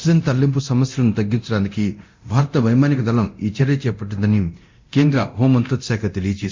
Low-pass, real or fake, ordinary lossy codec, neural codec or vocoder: 7.2 kHz; fake; MP3, 64 kbps; codec, 24 kHz, 0.9 kbps, DualCodec